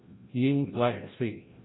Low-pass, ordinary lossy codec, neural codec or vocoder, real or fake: 7.2 kHz; AAC, 16 kbps; codec, 16 kHz, 0.5 kbps, FreqCodec, larger model; fake